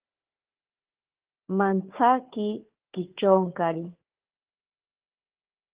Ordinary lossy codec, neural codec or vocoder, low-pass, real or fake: Opus, 16 kbps; codec, 16 kHz, 4 kbps, FunCodec, trained on Chinese and English, 50 frames a second; 3.6 kHz; fake